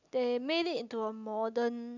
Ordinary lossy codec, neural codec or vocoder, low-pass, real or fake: none; none; 7.2 kHz; real